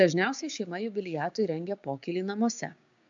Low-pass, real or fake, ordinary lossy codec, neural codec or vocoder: 7.2 kHz; fake; AAC, 64 kbps; codec, 16 kHz, 6 kbps, DAC